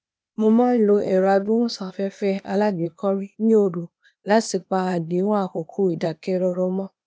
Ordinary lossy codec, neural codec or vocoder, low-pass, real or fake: none; codec, 16 kHz, 0.8 kbps, ZipCodec; none; fake